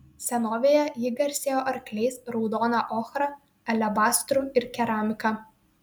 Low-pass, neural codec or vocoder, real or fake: 19.8 kHz; none; real